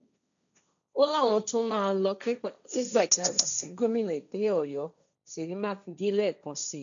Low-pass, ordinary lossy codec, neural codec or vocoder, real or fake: 7.2 kHz; none; codec, 16 kHz, 1.1 kbps, Voila-Tokenizer; fake